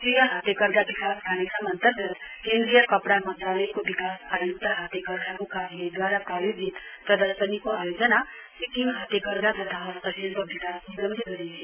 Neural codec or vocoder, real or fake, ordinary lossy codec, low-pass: none; real; none; 3.6 kHz